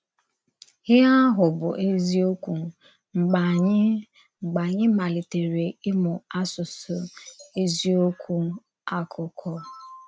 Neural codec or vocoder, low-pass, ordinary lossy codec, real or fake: none; none; none; real